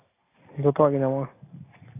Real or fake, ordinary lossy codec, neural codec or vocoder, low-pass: real; AAC, 16 kbps; none; 3.6 kHz